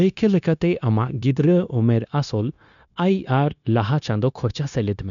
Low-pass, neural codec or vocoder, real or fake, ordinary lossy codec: 7.2 kHz; codec, 16 kHz, 0.9 kbps, LongCat-Audio-Codec; fake; none